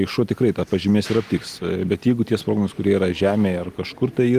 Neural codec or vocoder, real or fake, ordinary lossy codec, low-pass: none; real; Opus, 24 kbps; 14.4 kHz